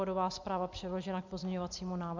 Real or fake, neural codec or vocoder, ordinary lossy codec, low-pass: real; none; MP3, 64 kbps; 7.2 kHz